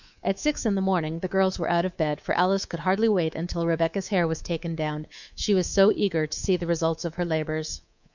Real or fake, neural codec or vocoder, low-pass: fake; codec, 24 kHz, 3.1 kbps, DualCodec; 7.2 kHz